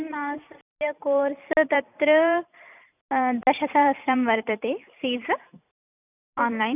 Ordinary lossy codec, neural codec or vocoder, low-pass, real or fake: none; vocoder, 44.1 kHz, 128 mel bands every 256 samples, BigVGAN v2; 3.6 kHz; fake